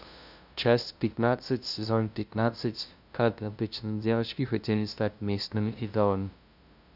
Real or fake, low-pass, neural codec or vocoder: fake; 5.4 kHz; codec, 16 kHz, 0.5 kbps, FunCodec, trained on LibriTTS, 25 frames a second